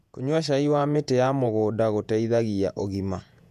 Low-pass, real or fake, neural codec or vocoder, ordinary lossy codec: 14.4 kHz; fake; vocoder, 48 kHz, 128 mel bands, Vocos; none